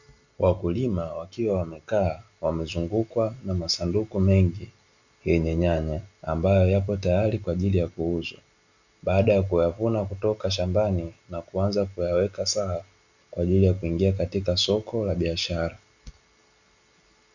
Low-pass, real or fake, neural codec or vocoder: 7.2 kHz; real; none